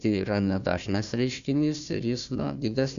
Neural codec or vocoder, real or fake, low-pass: codec, 16 kHz, 1 kbps, FunCodec, trained on Chinese and English, 50 frames a second; fake; 7.2 kHz